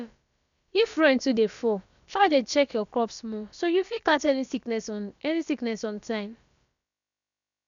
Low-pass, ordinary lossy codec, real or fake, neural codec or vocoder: 7.2 kHz; none; fake; codec, 16 kHz, about 1 kbps, DyCAST, with the encoder's durations